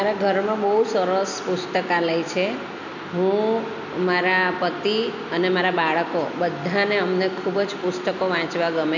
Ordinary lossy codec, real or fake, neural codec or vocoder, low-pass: none; real; none; 7.2 kHz